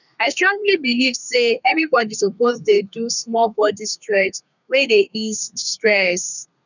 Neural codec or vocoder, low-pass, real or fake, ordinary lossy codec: codec, 32 kHz, 1.9 kbps, SNAC; 7.2 kHz; fake; none